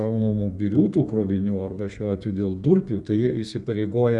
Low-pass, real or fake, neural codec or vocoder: 10.8 kHz; fake; codec, 32 kHz, 1.9 kbps, SNAC